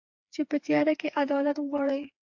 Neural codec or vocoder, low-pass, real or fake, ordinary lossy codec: codec, 16 kHz, 4 kbps, FreqCodec, smaller model; 7.2 kHz; fake; AAC, 48 kbps